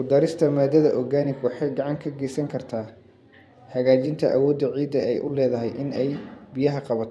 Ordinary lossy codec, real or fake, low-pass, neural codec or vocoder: none; fake; none; vocoder, 24 kHz, 100 mel bands, Vocos